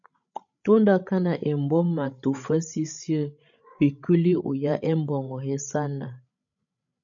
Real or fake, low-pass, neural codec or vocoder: fake; 7.2 kHz; codec, 16 kHz, 16 kbps, FreqCodec, larger model